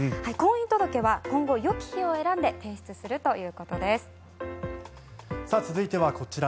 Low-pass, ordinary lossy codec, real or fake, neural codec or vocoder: none; none; real; none